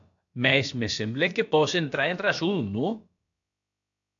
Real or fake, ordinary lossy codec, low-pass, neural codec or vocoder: fake; AAC, 64 kbps; 7.2 kHz; codec, 16 kHz, about 1 kbps, DyCAST, with the encoder's durations